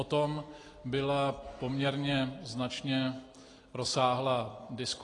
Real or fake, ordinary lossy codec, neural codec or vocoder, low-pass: real; AAC, 32 kbps; none; 10.8 kHz